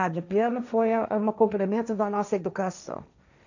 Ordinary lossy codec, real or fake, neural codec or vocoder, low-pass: none; fake; codec, 16 kHz, 1.1 kbps, Voila-Tokenizer; 7.2 kHz